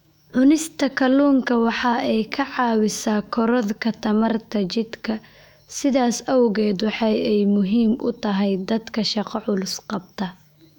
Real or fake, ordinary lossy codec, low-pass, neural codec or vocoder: fake; none; 19.8 kHz; autoencoder, 48 kHz, 128 numbers a frame, DAC-VAE, trained on Japanese speech